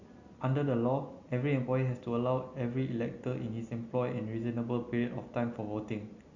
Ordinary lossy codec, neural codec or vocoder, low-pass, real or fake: Opus, 64 kbps; none; 7.2 kHz; real